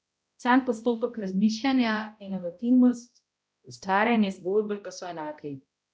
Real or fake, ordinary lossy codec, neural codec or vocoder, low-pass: fake; none; codec, 16 kHz, 0.5 kbps, X-Codec, HuBERT features, trained on balanced general audio; none